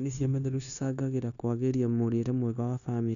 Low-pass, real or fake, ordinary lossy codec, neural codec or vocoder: 7.2 kHz; fake; none; codec, 16 kHz, 0.9 kbps, LongCat-Audio-Codec